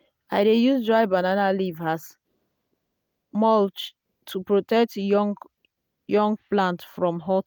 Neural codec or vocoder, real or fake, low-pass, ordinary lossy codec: none; real; none; none